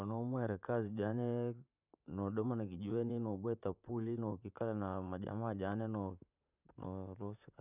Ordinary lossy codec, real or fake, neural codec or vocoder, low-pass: none; fake; vocoder, 44.1 kHz, 128 mel bands, Pupu-Vocoder; 3.6 kHz